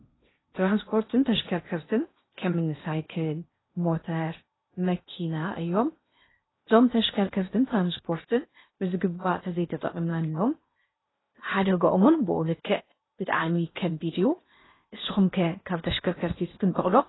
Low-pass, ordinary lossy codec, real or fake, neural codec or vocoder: 7.2 kHz; AAC, 16 kbps; fake; codec, 16 kHz in and 24 kHz out, 0.8 kbps, FocalCodec, streaming, 65536 codes